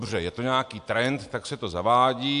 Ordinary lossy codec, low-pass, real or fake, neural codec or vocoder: AAC, 64 kbps; 10.8 kHz; real; none